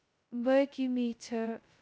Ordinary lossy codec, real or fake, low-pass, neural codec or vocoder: none; fake; none; codec, 16 kHz, 0.2 kbps, FocalCodec